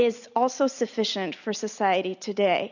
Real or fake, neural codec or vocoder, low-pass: real; none; 7.2 kHz